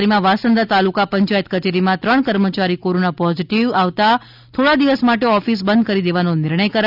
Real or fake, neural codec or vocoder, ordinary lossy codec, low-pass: real; none; none; 5.4 kHz